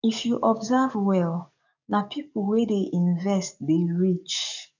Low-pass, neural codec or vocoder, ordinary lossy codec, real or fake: 7.2 kHz; codec, 44.1 kHz, 7.8 kbps, DAC; none; fake